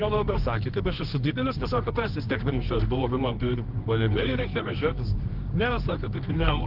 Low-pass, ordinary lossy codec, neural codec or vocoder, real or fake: 5.4 kHz; Opus, 16 kbps; codec, 24 kHz, 0.9 kbps, WavTokenizer, medium music audio release; fake